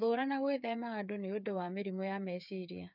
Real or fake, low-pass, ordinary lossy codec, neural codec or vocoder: fake; 5.4 kHz; none; codec, 16 kHz, 8 kbps, FreqCodec, smaller model